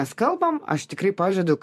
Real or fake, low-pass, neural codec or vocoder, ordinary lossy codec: fake; 14.4 kHz; vocoder, 44.1 kHz, 128 mel bands every 512 samples, BigVGAN v2; MP3, 64 kbps